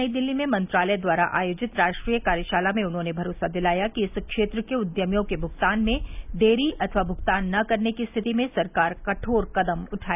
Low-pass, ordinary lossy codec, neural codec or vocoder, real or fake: 3.6 kHz; none; none; real